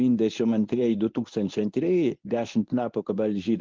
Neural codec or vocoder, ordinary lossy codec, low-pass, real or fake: codec, 24 kHz, 0.9 kbps, WavTokenizer, medium speech release version 1; Opus, 32 kbps; 7.2 kHz; fake